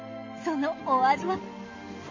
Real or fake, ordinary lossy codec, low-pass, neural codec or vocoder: real; MP3, 32 kbps; 7.2 kHz; none